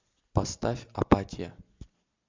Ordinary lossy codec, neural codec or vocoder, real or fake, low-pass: MP3, 64 kbps; none; real; 7.2 kHz